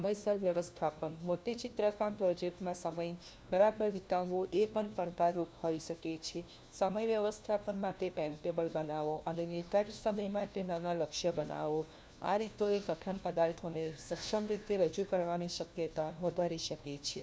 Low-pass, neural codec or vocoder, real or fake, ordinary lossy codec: none; codec, 16 kHz, 1 kbps, FunCodec, trained on LibriTTS, 50 frames a second; fake; none